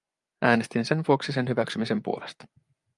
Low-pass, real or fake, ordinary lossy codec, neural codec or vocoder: 10.8 kHz; fake; Opus, 32 kbps; vocoder, 24 kHz, 100 mel bands, Vocos